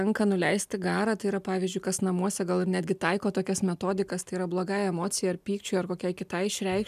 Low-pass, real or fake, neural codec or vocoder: 14.4 kHz; real; none